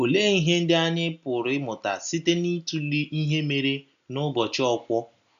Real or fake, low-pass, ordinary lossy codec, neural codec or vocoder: real; 7.2 kHz; none; none